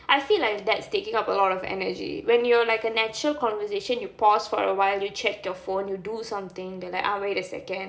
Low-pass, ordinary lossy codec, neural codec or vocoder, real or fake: none; none; none; real